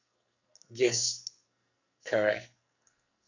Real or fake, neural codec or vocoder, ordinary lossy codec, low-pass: fake; codec, 44.1 kHz, 2.6 kbps, SNAC; none; 7.2 kHz